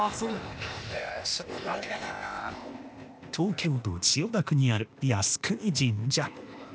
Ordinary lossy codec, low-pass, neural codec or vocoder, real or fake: none; none; codec, 16 kHz, 0.8 kbps, ZipCodec; fake